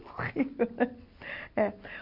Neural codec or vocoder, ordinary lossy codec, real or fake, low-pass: none; MP3, 48 kbps; real; 5.4 kHz